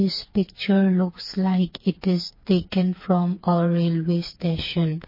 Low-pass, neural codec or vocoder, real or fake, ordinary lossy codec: 5.4 kHz; codec, 16 kHz, 4 kbps, FreqCodec, smaller model; fake; MP3, 24 kbps